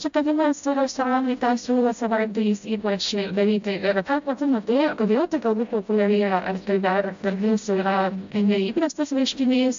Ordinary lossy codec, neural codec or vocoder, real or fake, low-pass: AAC, 64 kbps; codec, 16 kHz, 0.5 kbps, FreqCodec, smaller model; fake; 7.2 kHz